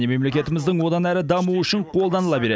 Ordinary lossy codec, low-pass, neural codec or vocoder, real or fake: none; none; none; real